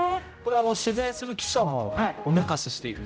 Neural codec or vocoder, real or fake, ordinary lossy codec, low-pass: codec, 16 kHz, 0.5 kbps, X-Codec, HuBERT features, trained on general audio; fake; none; none